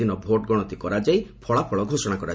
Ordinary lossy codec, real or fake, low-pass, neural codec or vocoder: none; real; none; none